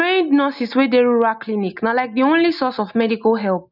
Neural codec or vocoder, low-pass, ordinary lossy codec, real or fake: none; 5.4 kHz; none; real